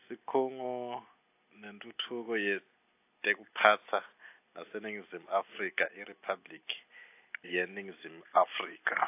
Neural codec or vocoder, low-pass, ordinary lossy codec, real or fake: none; 3.6 kHz; AAC, 24 kbps; real